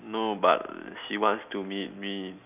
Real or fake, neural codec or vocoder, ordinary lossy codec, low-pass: real; none; none; 3.6 kHz